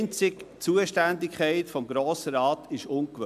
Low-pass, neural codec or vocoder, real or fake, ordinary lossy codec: 14.4 kHz; none; real; AAC, 96 kbps